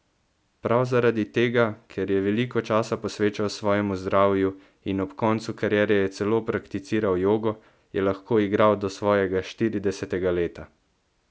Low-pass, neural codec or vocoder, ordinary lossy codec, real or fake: none; none; none; real